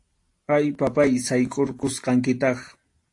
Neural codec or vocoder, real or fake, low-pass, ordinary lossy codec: none; real; 10.8 kHz; AAC, 48 kbps